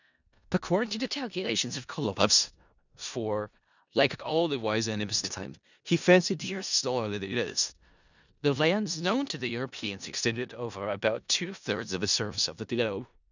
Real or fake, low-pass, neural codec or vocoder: fake; 7.2 kHz; codec, 16 kHz in and 24 kHz out, 0.4 kbps, LongCat-Audio-Codec, four codebook decoder